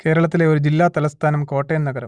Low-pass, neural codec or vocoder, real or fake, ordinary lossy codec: 9.9 kHz; none; real; Opus, 64 kbps